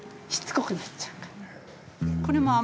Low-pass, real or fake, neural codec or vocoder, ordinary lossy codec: none; real; none; none